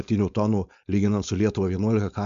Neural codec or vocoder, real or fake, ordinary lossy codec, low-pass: codec, 16 kHz, 4.8 kbps, FACodec; fake; MP3, 64 kbps; 7.2 kHz